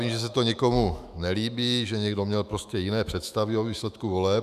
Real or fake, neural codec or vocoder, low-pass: fake; autoencoder, 48 kHz, 128 numbers a frame, DAC-VAE, trained on Japanese speech; 14.4 kHz